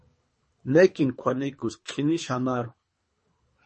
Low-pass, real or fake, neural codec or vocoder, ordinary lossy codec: 10.8 kHz; fake; codec, 24 kHz, 3 kbps, HILCodec; MP3, 32 kbps